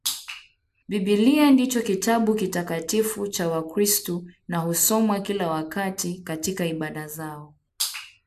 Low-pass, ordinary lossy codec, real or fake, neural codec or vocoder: 14.4 kHz; MP3, 96 kbps; real; none